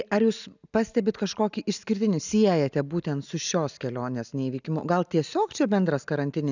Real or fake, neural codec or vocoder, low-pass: real; none; 7.2 kHz